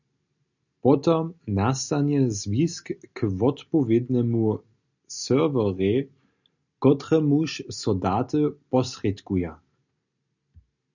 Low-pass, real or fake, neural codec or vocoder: 7.2 kHz; real; none